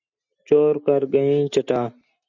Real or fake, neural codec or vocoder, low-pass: real; none; 7.2 kHz